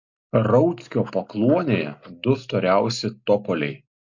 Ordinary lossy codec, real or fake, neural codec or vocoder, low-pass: MP3, 48 kbps; real; none; 7.2 kHz